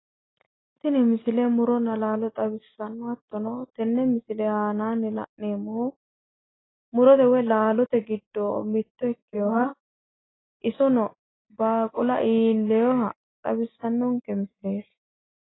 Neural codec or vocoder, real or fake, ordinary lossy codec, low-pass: none; real; AAC, 16 kbps; 7.2 kHz